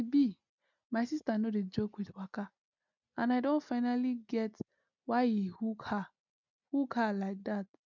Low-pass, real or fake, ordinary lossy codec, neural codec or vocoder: 7.2 kHz; real; none; none